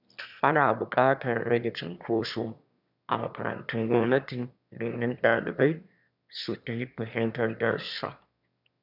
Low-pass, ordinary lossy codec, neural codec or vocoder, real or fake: 5.4 kHz; none; autoencoder, 22.05 kHz, a latent of 192 numbers a frame, VITS, trained on one speaker; fake